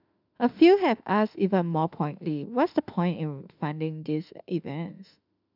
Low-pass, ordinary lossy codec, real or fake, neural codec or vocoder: 5.4 kHz; none; fake; autoencoder, 48 kHz, 32 numbers a frame, DAC-VAE, trained on Japanese speech